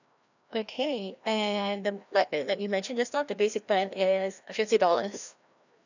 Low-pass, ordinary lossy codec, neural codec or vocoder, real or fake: 7.2 kHz; none; codec, 16 kHz, 1 kbps, FreqCodec, larger model; fake